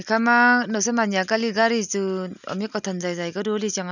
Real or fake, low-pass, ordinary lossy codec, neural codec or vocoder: real; 7.2 kHz; none; none